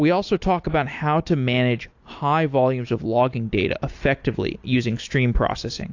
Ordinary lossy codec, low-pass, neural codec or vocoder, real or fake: AAC, 48 kbps; 7.2 kHz; none; real